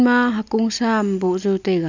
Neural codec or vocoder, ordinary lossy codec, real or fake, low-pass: none; none; real; 7.2 kHz